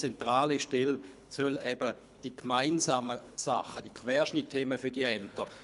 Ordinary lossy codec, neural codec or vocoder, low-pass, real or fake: none; codec, 24 kHz, 3 kbps, HILCodec; 10.8 kHz; fake